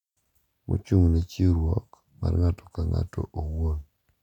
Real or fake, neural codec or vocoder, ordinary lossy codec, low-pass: real; none; none; 19.8 kHz